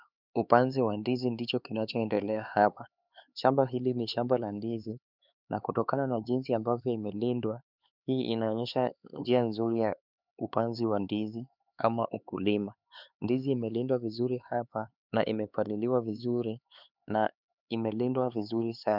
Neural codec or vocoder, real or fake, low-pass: codec, 16 kHz, 4 kbps, X-Codec, HuBERT features, trained on LibriSpeech; fake; 5.4 kHz